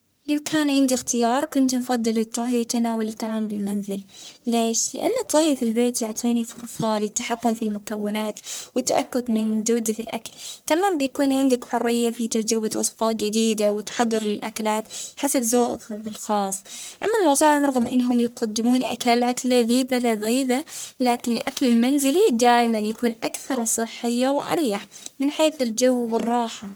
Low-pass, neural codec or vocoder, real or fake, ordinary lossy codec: none; codec, 44.1 kHz, 1.7 kbps, Pupu-Codec; fake; none